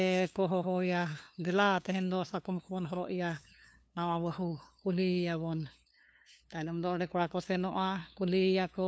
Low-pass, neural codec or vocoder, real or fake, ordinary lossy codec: none; codec, 16 kHz, 2 kbps, FunCodec, trained on LibriTTS, 25 frames a second; fake; none